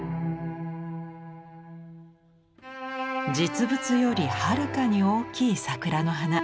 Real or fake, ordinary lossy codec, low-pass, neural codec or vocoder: real; none; none; none